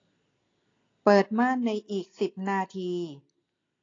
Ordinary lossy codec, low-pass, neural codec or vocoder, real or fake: AAC, 32 kbps; 7.2 kHz; none; real